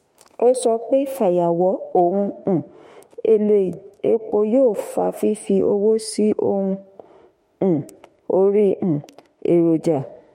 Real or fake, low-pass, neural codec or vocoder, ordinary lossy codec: fake; 19.8 kHz; autoencoder, 48 kHz, 32 numbers a frame, DAC-VAE, trained on Japanese speech; MP3, 64 kbps